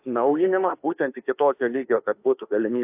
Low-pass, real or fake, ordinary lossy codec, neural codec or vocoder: 3.6 kHz; fake; AAC, 32 kbps; autoencoder, 48 kHz, 32 numbers a frame, DAC-VAE, trained on Japanese speech